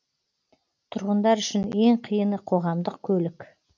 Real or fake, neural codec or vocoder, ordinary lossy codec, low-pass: real; none; none; 7.2 kHz